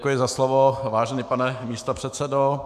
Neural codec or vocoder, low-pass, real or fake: autoencoder, 48 kHz, 128 numbers a frame, DAC-VAE, trained on Japanese speech; 14.4 kHz; fake